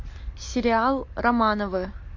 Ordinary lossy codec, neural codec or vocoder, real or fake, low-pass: MP3, 48 kbps; none; real; 7.2 kHz